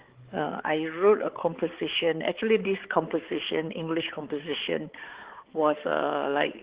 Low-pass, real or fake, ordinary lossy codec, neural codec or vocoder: 3.6 kHz; fake; Opus, 16 kbps; codec, 16 kHz, 4 kbps, X-Codec, HuBERT features, trained on balanced general audio